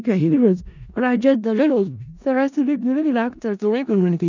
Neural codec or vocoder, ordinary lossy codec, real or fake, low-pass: codec, 16 kHz in and 24 kHz out, 0.4 kbps, LongCat-Audio-Codec, four codebook decoder; none; fake; 7.2 kHz